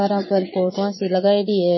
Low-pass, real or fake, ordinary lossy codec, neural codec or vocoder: 7.2 kHz; fake; MP3, 24 kbps; vocoder, 22.05 kHz, 80 mel bands, Vocos